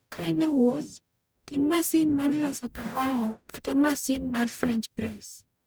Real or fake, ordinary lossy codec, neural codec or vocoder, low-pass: fake; none; codec, 44.1 kHz, 0.9 kbps, DAC; none